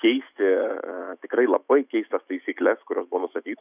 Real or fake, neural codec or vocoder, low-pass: fake; autoencoder, 48 kHz, 128 numbers a frame, DAC-VAE, trained on Japanese speech; 3.6 kHz